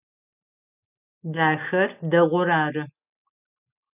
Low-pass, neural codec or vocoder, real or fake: 3.6 kHz; none; real